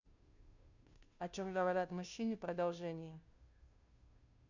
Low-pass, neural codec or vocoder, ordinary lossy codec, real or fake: 7.2 kHz; codec, 16 kHz, 0.5 kbps, FunCodec, trained on Chinese and English, 25 frames a second; none; fake